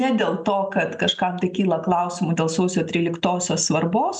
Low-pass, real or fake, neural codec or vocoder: 9.9 kHz; real; none